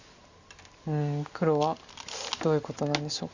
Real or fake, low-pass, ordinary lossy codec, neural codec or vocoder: real; 7.2 kHz; Opus, 64 kbps; none